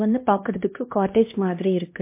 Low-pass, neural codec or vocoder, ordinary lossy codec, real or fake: 3.6 kHz; codec, 16 kHz, 1 kbps, X-Codec, HuBERT features, trained on LibriSpeech; MP3, 24 kbps; fake